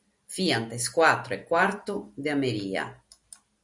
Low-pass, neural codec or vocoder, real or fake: 10.8 kHz; none; real